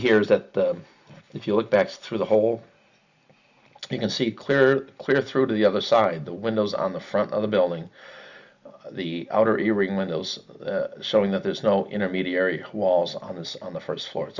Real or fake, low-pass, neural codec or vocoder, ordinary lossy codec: real; 7.2 kHz; none; Opus, 64 kbps